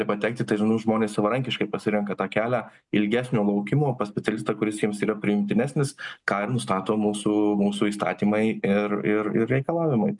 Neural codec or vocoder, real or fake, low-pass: none; real; 10.8 kHz